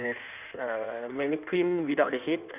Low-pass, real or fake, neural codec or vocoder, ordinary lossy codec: 3.6 kHz; fake; codec, 16 kHz in and 24 kHz out, 1.1 kbps, FireRedTTS-2 codec; none